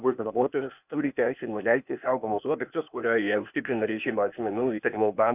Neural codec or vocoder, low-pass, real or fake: codec, 16 kHz, 0.8 kbps, ZipCodec; 3.6 kHz; fake